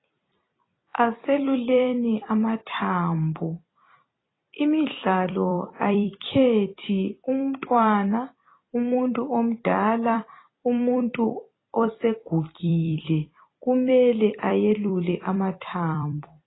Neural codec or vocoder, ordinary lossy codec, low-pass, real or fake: vocoder, 44.1 kHz, 128 mel bands every 256 samples, BigVGAN v2; AAC, 16 kbps; 7.2 kHz; fake